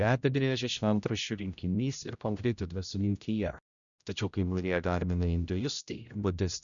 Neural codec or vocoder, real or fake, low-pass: codec, 16 kHz, 0.5 kbps, X-Codec, HuBERT features, trained on general audio; fake; 7.2 kHz